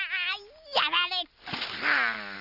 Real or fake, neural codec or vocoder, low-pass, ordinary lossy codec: real; none; 5.4 kHz; none